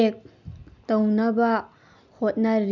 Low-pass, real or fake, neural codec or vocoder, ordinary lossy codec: 7.2 kHz; real; none; none